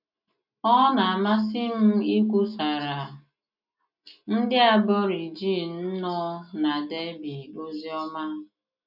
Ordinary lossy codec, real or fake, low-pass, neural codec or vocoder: none; real; 5.4 kHz; none